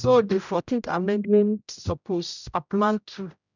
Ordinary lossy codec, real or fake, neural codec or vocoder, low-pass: none; fake; codec, 16 kHz, 0.5 kbps, X-Codec, HuBERT features, trained on general audio; 7.2 kHz